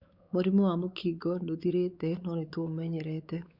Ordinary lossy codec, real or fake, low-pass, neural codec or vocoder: Opus, 64 kbps; fake; 5.4 kHz; codec, 16 kHz, 4 kbps, X-Codec, WavLM features, trained on Multilingual LibriSpeech